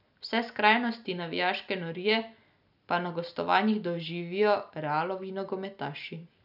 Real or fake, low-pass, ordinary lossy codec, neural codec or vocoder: real; 5.4 kHz; none; none